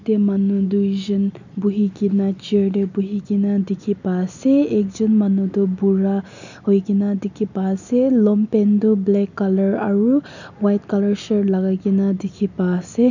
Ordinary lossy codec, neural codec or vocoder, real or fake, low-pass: none; none; real; 7.2 kHz